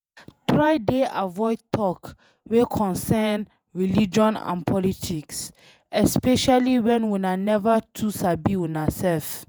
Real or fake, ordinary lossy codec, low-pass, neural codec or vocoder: fake; none; none; vocoder, 48 kHz, 128 mel bands, Vocos